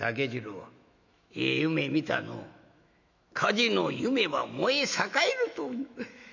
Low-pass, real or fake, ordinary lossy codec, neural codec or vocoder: 7.2 kHz; fake; none; autoencoder, 48 kHz, 128 numbers a frame, DAC-VAE, trained on Japanese speech